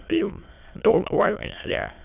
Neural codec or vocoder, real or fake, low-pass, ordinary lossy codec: autoencoder, 22.05 kHz, a latent of 192 numbers a frame, VITS, trained on many speakers; fake; 3.6 kHz; none